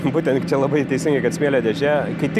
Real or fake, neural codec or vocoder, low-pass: real; none; 14.4 kHz